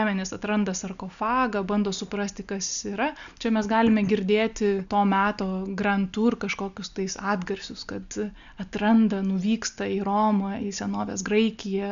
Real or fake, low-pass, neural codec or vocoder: real; 7.2 kHz; none